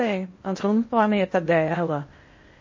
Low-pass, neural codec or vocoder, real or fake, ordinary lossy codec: 7.2 kHz; codec, 16 kHz in and 24 kHz out, 0.8 kbps, FocalCodec, streaming, 65536 codes; fake; MP3, 32 kbps